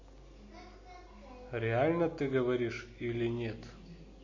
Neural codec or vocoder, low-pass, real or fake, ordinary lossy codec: none; 7.2 kHz; real; MP3, 32 kbps